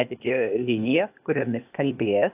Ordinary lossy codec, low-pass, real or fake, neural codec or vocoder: AAC, 32 kbps; 3.6 kHz; fake; codec, 16 kHz, 0.8 kbps, ZipCodec